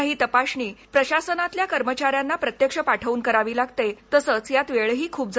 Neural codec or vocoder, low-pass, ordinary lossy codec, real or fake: none; none; none; real